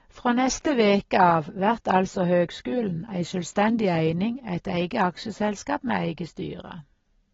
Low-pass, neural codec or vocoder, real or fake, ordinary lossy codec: 7.2 kHz; none; real; AAC, 24 kbps